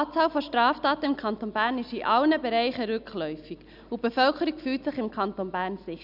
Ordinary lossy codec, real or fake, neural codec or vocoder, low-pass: none; real; none; 5.4 kHz